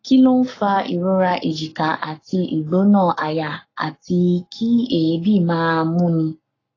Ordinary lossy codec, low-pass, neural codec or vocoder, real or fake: AAC, 32 kbps; 7.2 kHz; codec, 44.1 kHz, 7.8 kbps, Pupu-Codec; fake